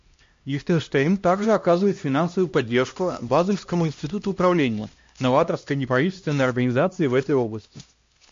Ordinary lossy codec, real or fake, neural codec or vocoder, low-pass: MP3, 48 kbps; fake; codec, 16 kHz, 1 kbps, X-Codec, HuBERT features, trained on LibriSpeech; 7.2 kHz